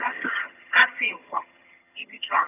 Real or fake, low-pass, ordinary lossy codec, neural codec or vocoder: fake; 3.6 kHz; none; vocoder, 22.05 kHz, 80 mel bands, HiFi-GAN